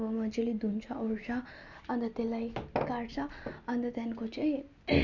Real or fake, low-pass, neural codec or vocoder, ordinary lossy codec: real; 7.2 kHz; none; none